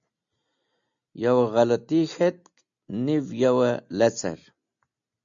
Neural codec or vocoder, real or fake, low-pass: none; real; 7.2 kHz